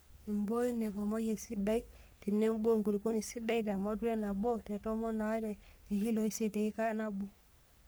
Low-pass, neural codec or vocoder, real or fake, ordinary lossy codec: none; codec, 44.1 kHz, 3.4 kbps, Pupu-Codec; fake; none